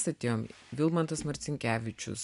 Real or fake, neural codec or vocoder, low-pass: real; none; 10.8 kHz